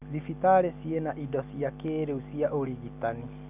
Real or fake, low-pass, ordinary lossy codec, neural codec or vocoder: real; 3.6 kHz; none; none